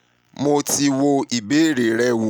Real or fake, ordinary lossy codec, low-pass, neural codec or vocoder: real; none; none; none